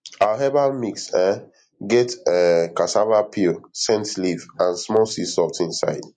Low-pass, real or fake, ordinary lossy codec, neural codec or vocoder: 9.9 kHz; real; MP3, 48 kbps; none